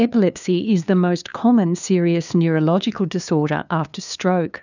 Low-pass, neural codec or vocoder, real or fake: 7.2 kHz; codec, 16 kHz, 2 kbps, FunCodec, trained on LibriTTS, 25 frames a second; fake